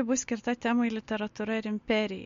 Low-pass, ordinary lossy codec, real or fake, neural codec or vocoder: 7.2 kHz; MP3, 48 kbps; real; none